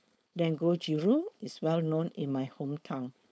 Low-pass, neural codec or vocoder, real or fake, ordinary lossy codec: none; codec, 16 kHz, 4.8 kbps, FACodec; fake; none